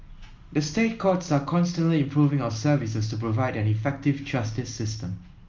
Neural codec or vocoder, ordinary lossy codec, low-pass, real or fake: none; Opus, 32 kbps; 7.2 kHz; real